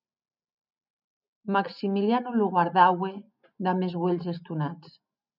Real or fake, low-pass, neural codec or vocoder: real; 5.4 kHz; none